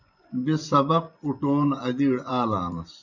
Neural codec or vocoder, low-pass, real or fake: vocoder, 24 kHz, 100 mel bands, Vocos; 7.2 kHz; fake